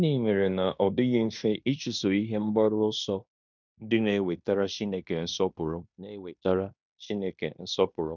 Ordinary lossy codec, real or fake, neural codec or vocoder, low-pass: none; fake; codec, 16 kHz in and 24 kHz out, 0.9 kbps, LongCat-Audio-Codec, fine tuned four codebook decoder; 7.2 kHz